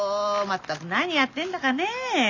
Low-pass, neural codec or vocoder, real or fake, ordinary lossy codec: 7.2 kHz; none; real; none